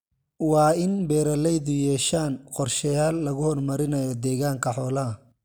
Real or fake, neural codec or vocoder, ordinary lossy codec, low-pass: real; none; none; none